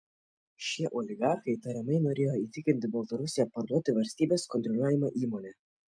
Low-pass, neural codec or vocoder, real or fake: 9.9 kHz; none; real